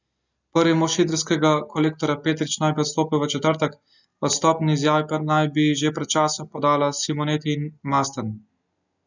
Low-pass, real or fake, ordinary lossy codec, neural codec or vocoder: 7.2 kHz; real; none; none